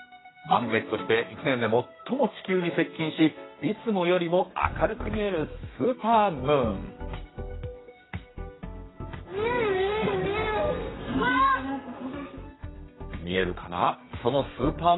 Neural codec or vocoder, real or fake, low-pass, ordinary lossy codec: codec, 32 kHz, 1.9 kbps, SNAC; fake; 7.2 kHz; AAC, 16 kbps